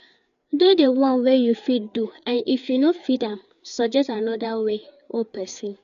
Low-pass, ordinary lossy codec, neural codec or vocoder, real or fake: 7.2 kHz; none; codec, 16 kHz, 4 kbps, FreqCodec, larger model; fake